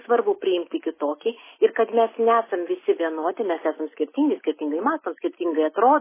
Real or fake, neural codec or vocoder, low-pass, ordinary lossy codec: real; none; 3.6 kHz; MP3, 16 kbps